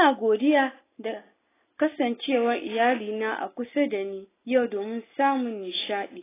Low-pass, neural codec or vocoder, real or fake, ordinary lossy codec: 3.6 kHz; none; real; AAC, 16 kbps